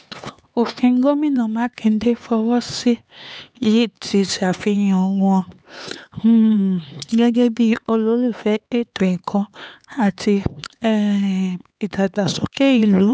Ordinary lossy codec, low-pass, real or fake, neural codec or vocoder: none; none; fake; codec, 16 kHz, 4 kbps, X-Codec, HuBERT features, trained on LibriSpeech